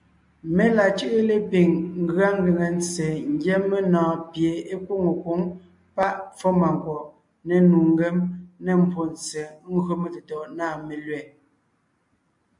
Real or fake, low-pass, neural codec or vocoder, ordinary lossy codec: real; 10.8 kHz; none; MP3, 64 kbps